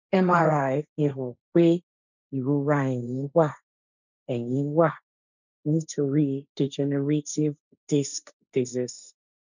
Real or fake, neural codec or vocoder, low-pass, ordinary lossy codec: fake; codec, 16 kHz, 1.1 kbps, Voila-Tokenizer; 7.2 kHz; none